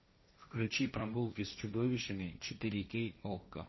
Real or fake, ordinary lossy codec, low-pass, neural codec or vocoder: fake; MP3, 24 kbps; 7.2 kHz; codec, 16 kHz, 1.1 kbps, Voila-Tokenizer